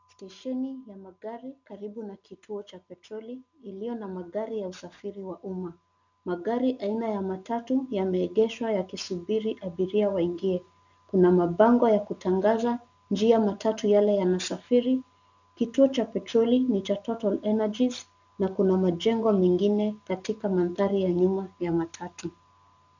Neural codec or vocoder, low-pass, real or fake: none; 7.2 kHz; real